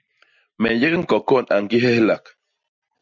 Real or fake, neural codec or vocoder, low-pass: real; none; 7.2 kHz